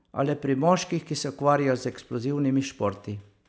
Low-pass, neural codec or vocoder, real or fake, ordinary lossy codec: none; none; real; none